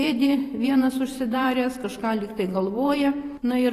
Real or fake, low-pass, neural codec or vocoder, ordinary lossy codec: fake; 14.4 kHz; vocoder, 44.1 kHz, 128 mel bands every 512 samples, BigVGAN v2; AAC, 48 kbps